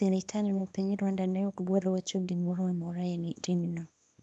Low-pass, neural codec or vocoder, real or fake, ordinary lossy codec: none; codec, 24 kHz, 0.9 kbps, WavTokenizer, small release; fake; none